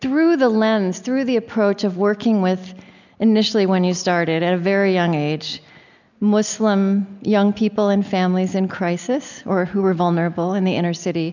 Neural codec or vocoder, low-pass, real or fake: none; 7.2 kHz; real